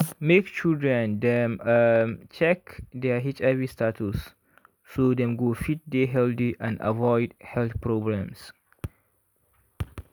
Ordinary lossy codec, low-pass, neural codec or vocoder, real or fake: none; none; none; real